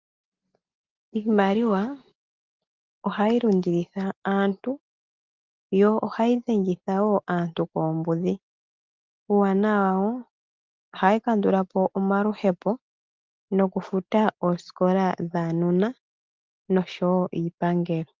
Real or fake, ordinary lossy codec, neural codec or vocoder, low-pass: real; Opus, 32 kbps; none; 7.2 kHz